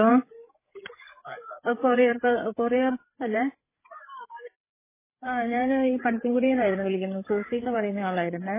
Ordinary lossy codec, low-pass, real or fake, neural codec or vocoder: MP3, 16 kbps; 3.6 kHz; fake; codec, 16 kHz, 8 kbps, FreqCodec, larger model